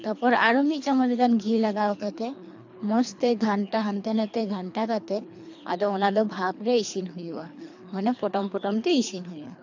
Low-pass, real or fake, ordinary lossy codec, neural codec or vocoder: 7.2 kHz; fake; AAC, 48 kbps; codec, 24 kHz, 3 kbps, HILCodec